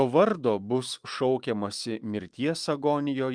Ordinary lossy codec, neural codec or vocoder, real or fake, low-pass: Opus, 32 kbps; autoencoder, 48 kHz, 128 numbers a frame, DAC-VAE, trained on Japanese speech; fake; 9.9 kHz